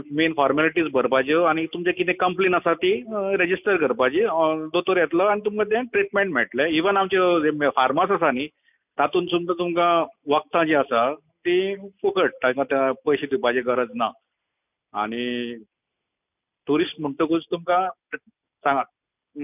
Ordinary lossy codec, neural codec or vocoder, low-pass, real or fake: none; none; 3.6 kHz; real